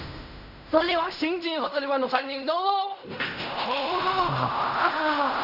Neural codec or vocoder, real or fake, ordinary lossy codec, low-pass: codec, 16 kHz in and 24 kHz out, 0.4 kbps, LongCat-Audio-Codec, fine tuned four codebook decoder; fake; none; 5.4 kHz